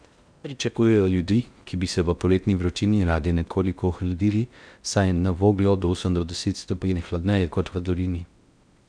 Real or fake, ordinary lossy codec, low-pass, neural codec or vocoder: fake; none; 9.9 kHz; codec, 16 kHz in and 24 kHz out, 0.6 kbps, FocalCodec, streaming, 2048 codes